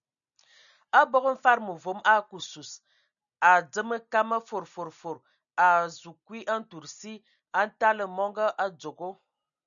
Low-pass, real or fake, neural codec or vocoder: 7.2 kHz; real; none